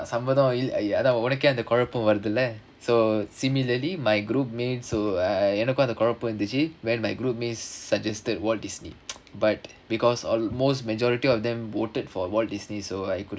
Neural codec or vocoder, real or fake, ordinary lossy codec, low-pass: none; real; none; none